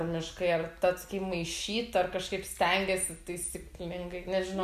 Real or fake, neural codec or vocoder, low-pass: real; none; 14.4 kHz